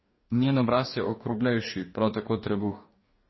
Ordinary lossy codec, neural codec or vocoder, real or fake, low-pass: MP3, 24 kbps; codec, 44.1 kHz, 2.6 kbps, DAC; fake; 7.2 kHz